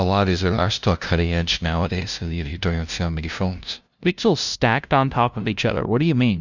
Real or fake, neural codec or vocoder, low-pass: fake; codec, 16 kHz, 0.5 kbps, FunCodec, trained on LibriTTS, 25 frames a second; 7.2 kHz